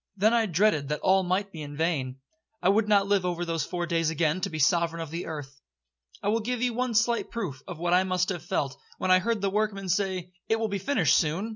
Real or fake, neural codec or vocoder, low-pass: real; none; 7.2 kHz